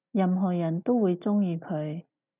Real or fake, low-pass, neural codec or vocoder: real; 3.6 kHz; none